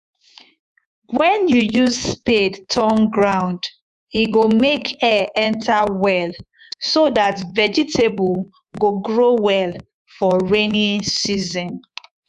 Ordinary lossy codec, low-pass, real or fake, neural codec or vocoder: none; 10.8 kHz; fake; codec, 24 kHz, 3.1 kbps, DualCodec